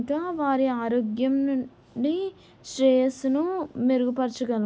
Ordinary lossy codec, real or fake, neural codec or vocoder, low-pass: none; real; none; none